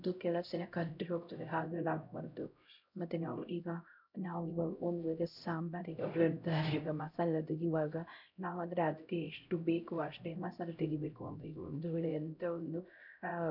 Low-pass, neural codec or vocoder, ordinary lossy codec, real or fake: 5.4 kHz; codec, 16 kHz, 0.5 kbps, X-Codec, HuBERT features, trained on LibriSpeech; none; fake